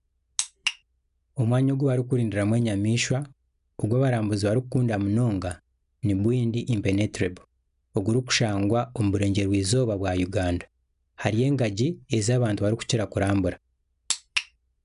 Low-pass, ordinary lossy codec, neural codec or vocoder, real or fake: 10.8 kHz; none; none; real